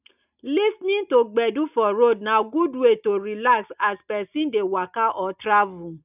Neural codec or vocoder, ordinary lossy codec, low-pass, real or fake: none; none; 3.6 kHz; real